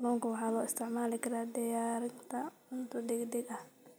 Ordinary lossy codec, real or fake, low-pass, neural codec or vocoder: none; real; none; none